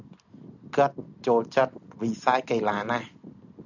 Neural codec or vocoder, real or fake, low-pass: none; real; 7.2 kHz